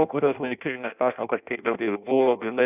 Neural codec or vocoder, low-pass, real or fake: codec, 16 kHz in and 24 kHz out, 0.6 kbps, FireRedTTS-2 codec; 3.6 kHz; fake